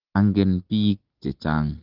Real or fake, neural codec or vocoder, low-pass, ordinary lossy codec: real; none; 5.4 kHz; Opus, 16 kbps